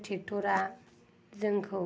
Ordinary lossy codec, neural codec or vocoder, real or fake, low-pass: none; none; real; none